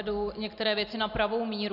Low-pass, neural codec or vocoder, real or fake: 5.4 kHz; none; real